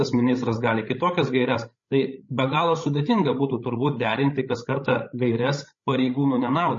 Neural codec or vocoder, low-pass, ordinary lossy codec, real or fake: codec, 16 kHz, 16 kbps, FreqCodec, larger model; 7.2 kHz; MP3, 32 kbps; fake